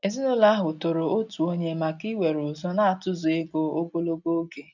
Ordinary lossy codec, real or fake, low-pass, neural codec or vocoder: none; real; 7.2 kHz; none